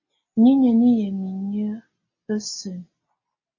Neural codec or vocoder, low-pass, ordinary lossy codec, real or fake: none; 7.2 kHz; MP3, 32 kbps; real